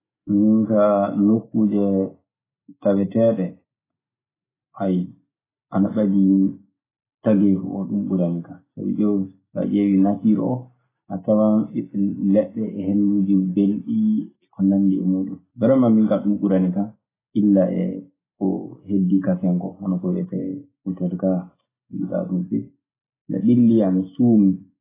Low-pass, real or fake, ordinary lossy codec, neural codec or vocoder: 3.6 kHz; real; AAC, 16 kbps; none